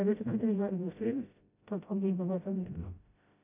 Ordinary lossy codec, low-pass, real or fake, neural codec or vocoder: AAC, 32 kbps; 3.6 kHz; fake; codec, 16 kHz, 0.5 kbps, FreqCodec, smaller model